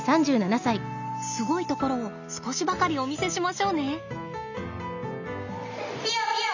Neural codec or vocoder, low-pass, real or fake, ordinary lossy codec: none; 7.2 kHz; real; none